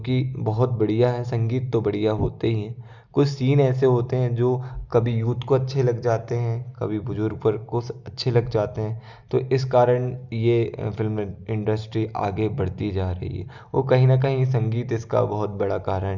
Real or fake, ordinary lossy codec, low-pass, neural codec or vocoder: real; Opus, 64 kbps; 7.2 kHz; none